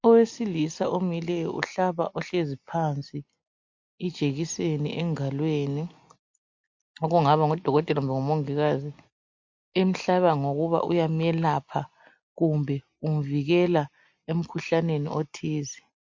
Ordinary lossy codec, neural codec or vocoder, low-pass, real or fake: MP3, 48 kbps; none; 7.2 kHz; real